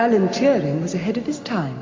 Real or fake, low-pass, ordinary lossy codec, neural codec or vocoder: real; 7.2 kHz; MP3, 48 kbps; none